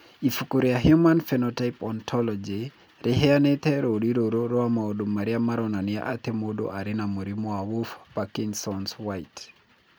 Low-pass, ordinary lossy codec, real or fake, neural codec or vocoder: none; none; real; none